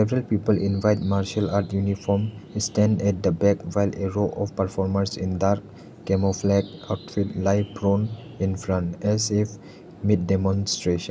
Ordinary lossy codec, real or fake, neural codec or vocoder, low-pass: none; real; none; none